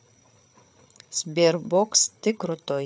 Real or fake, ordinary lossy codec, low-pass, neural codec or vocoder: fake; none; none; codec, 16 kHz, 8 kbps, FreqCodec, larger model